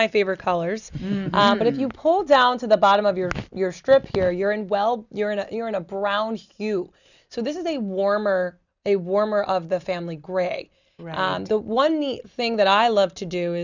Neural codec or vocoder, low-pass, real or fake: none; 7.2 kHz; real